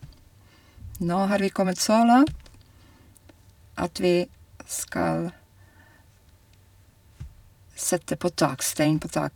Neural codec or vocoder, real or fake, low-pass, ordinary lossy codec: vocoder, 44.1 kHz, 128 mel bands every 512 samples, BigVGAN v2; fake; 19.8 kHz; none